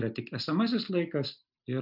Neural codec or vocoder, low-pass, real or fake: none; 5.4 kHz; real